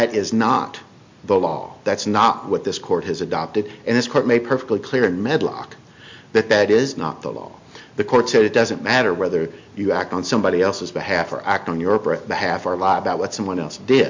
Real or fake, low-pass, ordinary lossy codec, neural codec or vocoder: real; 7.2 kHz; MP3, 48 kbps; none